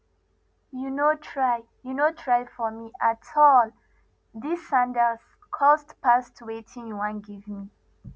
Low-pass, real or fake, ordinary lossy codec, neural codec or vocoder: none; real; none; none